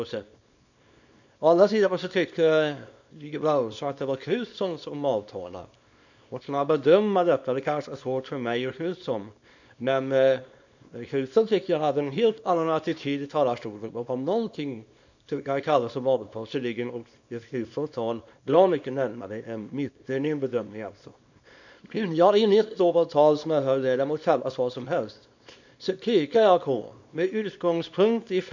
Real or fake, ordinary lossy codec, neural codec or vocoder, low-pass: fake; none; codec, 24 kHz, 0.9 kbps, WavTokenizer, small release; 7.2 kHz